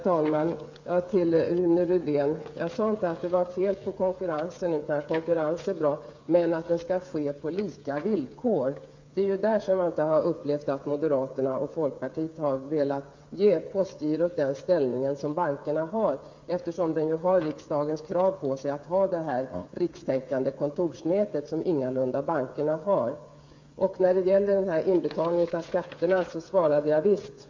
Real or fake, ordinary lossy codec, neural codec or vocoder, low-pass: fake; MP3, 48 kbps; codec, 16 kHz, 8 kbps, FreqCodec, smaller model; 7.2 kHz